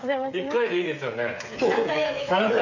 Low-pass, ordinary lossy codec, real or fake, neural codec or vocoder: 7.2 kHz; none; fake; codec, 16 kHz, 8 kbps, FreqCodec, smaller model